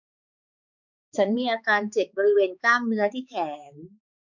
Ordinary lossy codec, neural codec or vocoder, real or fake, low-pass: none; codec, 16 kHz, 4 kbps, X-Codec, HuBERT features, trained on balanced general audio; fake; 7.2 kHz